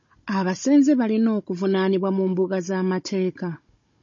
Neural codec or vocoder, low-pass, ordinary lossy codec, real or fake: codec, 16 kHz, 16 kbps, FunCodec, trained on Chinese and English, 50 frames a second; 7.2 kHz; MP3, 32 kbps; fake